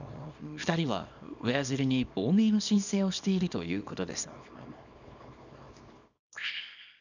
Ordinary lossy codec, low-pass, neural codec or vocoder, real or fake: none; 7.2 kHz; codec, 24 kHz, 0.9 kbps, WavTokenizer, small release; fake